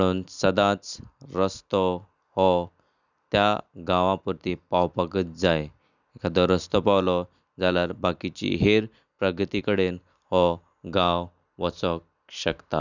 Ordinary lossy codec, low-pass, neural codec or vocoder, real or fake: none; 7.2 kHz; none; real